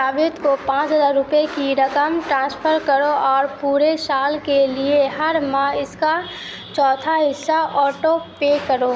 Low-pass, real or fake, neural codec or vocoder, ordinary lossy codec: none; real; none; none